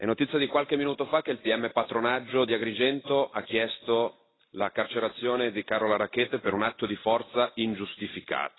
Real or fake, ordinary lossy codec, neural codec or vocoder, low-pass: real; AAC, 16 kbps; none; 7.2 kHz